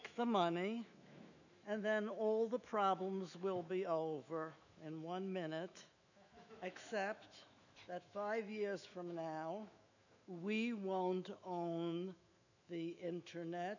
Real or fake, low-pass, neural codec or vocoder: fake; 7.2 kHz; autoencoder, 48 kHz, 128 numbers a frame, DAC-VAE, trained on Japanese speech